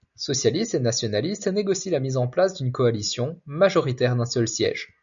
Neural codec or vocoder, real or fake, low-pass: none; real; 7.2 kHz